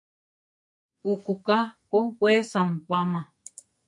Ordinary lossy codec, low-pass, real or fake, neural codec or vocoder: MP3, 64 kbps; 10.8 kHz; fake; codec, 44.1 kHz, 2.6 kbps, SNAC